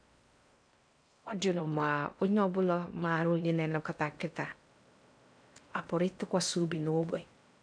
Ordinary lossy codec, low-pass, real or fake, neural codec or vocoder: none; 9.9 kHz; fake; codec, 16 kHz in and 24 kHz out, 0.6 kbps, FocalCodec, streaming, 4096 codes